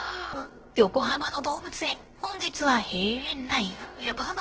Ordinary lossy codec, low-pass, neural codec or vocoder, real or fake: Opus, 16 kbps; 7.2 kHz; codec, 16 kHz, about 1 kbps, DyCAST, with the encoder's durations; fake